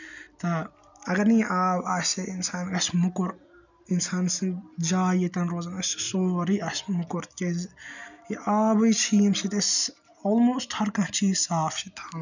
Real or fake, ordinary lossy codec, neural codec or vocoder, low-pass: real; none; none; 7.2 kHz